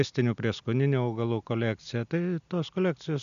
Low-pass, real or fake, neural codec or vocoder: 7.2 kHz; real; none